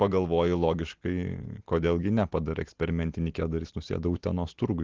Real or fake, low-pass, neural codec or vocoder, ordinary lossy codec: real; 7.2 kHz; none; Opus, 16 kbps